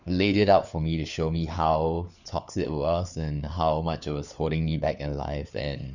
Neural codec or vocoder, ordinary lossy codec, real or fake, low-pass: codec, 16 kHz, 4 kbps, FunCodec, trained on LibriTTS, 50 frames a second; none; fake; 7.2 kHz